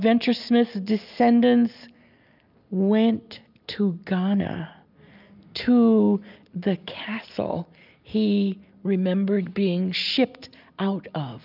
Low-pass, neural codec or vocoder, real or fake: 5.4 kHz; none; real